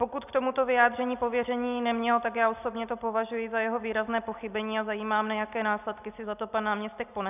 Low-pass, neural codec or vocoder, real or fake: 3.6 kHz; none; real